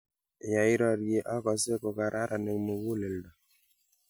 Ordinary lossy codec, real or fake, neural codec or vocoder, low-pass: none; real; none; none